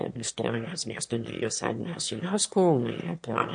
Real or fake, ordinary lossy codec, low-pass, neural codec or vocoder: fake; MP3, 48 kbps; 9.9 kHz; autoencoder, 22.05 kHz, a latent of 192 numbers a frame, VITS, trained on one speaker